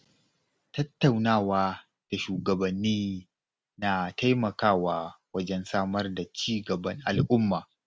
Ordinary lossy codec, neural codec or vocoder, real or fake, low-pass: none; none; real; none